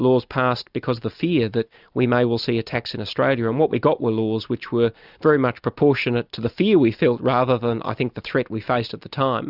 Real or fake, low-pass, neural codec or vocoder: real; 5.4 kHz; none